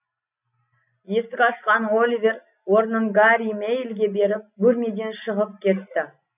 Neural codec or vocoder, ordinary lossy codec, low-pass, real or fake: none; none; 3.6 kHz; real